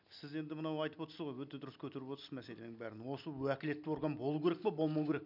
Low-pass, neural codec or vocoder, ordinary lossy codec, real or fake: 5.4 kHz; none; none; real